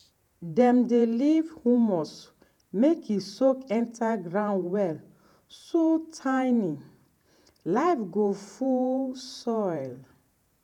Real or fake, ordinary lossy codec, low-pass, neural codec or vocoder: fake; none; 19.8 kHz; vocoder, 48 kHz, 128 mel bands, Vocos